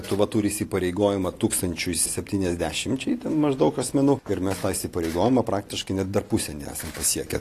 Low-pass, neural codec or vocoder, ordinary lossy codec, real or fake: 14.4 kHz; none; AAC, 48 kbps; real